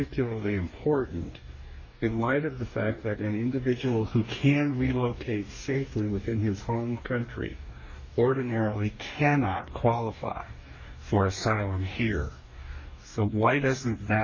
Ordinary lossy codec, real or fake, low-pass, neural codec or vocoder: MP3, 32 kbps; fake; 7.2 kHz; codec, 44.1 kHz, 2.6 kbps, DAC